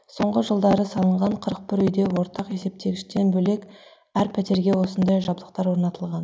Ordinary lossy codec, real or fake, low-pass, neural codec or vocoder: none; real; none; none